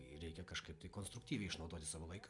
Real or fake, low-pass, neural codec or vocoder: real; 10.8 kHz; none